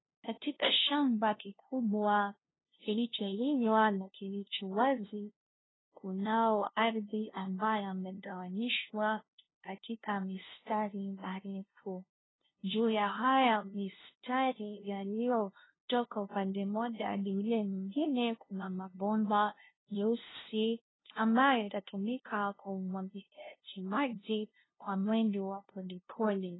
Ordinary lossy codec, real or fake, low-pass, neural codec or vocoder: AAC, 16 kbps; fake; 7.2 kHz; codec, 16 kHz, 0.5 kbps, FunCodec, trained on LibriTTS, 25 frames a second